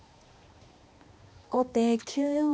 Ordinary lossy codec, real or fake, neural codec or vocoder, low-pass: none; fake; codec, 16 kHz, 2 kbps, X-Codec, HuBERT features, trained on balanced general audio; none